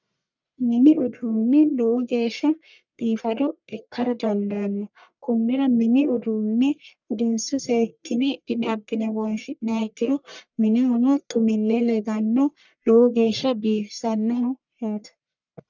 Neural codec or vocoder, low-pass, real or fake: codec, 44.1 kHz, 1.7 kbps, Pupu-Codec; 7.2 kHz; fake